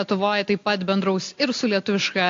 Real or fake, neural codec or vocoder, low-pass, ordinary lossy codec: real; none; 7.2 kHz; AAC, 48 kbps